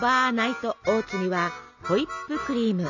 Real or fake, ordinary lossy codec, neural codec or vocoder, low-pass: real; none; none; 7.2 kHz